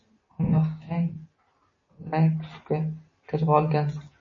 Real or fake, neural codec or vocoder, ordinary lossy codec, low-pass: real; none; MP3, 32 kbps; 7.2 kHz